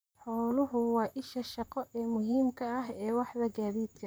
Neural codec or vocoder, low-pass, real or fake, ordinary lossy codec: vocoder, 44.1 kHz, 128 mel bands, Pupu-Vocoder; none; fake; none